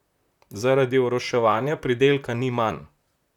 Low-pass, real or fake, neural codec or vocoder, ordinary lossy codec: 19.8 kHz; fake; vocoder, 44.1 kHz, 128 mel bands, Pupu-Vocoder; none